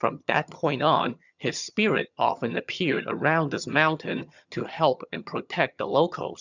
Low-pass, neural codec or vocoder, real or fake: 7.2 kHz; vocoder, 22.05 kHz, 80 mel bands, HiFi-GAN; fake